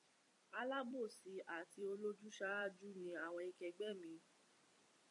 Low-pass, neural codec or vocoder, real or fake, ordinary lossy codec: 10.8 kHz; vocoder, 44.1 kHz, 128 mel bands every 256 samples, BigVGAN v2; fake; MP3, 48 kbps